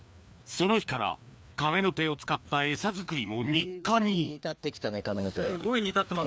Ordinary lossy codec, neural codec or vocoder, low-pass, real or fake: none; codec, 16 kHz, 2 kbps, FreqCodec, larger model; none; fake